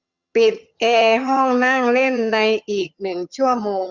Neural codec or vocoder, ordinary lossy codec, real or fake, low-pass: vocoder, 22.05 kHz, 80 mel bands, HiFi-GAN; Opus, 64 kbps; fake; 7.2 kHz